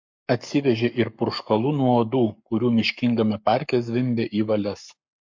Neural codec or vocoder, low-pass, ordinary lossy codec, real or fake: codec, 44.1 kHz, 7.8 kbps, Pupu-Codec; 7.2 kHz; MP3, 48 kbps; fake